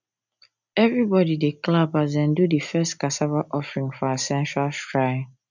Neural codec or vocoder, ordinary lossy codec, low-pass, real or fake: none; none; 7.2 kHz; real